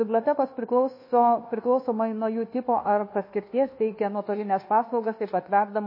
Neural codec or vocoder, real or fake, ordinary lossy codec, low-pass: codec, 24 kHz, 1.2 kbps, DualCodec; fake; MP3, 24 kbps; 5.4 kHz